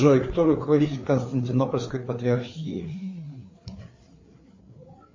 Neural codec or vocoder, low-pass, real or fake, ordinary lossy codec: codec, 16 kHz, 4 kbps, FreqCodec, larger model; 7.2 kHz; fake; MP3, 32 kbps